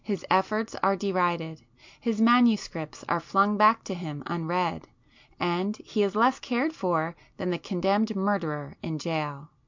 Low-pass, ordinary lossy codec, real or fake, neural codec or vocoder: 7.2 kHz; MP3, 64 kbps; real; none